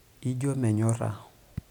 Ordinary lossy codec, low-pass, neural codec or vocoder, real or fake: none; 19.8 kHz; none; real